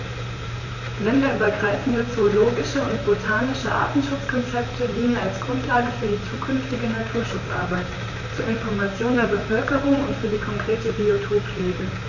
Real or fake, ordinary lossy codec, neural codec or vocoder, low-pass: fake; none; vocoder, 44.1 kHz, 128 mel bands, Pupu-Vocoder; 7.2 kHz